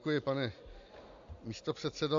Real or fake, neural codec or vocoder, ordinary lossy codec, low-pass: real; none; AAC, 64 kbps; 7.2 kHz